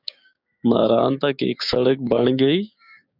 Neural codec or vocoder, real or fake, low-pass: codec, 16 kHz, 6 kbps, DAC; fake; 5.4 kHz